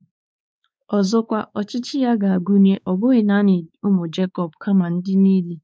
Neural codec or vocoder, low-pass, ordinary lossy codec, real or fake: codec, 16 kHz, 4 kbps, X-Codec, WavLM features, trained on Multilingual LibriSpeech; none; none; fake